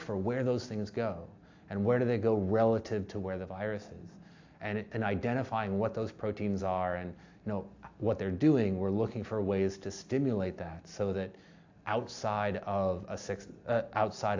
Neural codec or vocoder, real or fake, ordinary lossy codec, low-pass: none; real; AAC, 48 kbps; 7.2 kHz